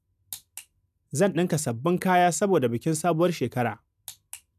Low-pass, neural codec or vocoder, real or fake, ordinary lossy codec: 14.4 kHz; none; real; none